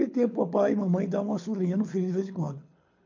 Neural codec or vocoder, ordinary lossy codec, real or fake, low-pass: codec, 24 kHz, 6 kbps, HILCodec; MP3, 48 kbps; fake; 7.2 kHz